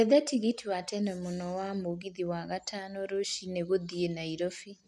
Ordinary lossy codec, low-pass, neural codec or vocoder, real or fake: none; none; none; real